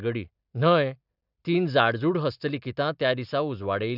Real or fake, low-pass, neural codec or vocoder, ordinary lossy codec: real; 5.4 kHz; none; none